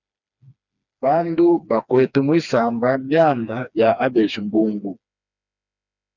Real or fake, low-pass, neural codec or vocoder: fake; 7.2 kHz; codec, 16 kHz, 2 kbps, FreqCodec, smaller model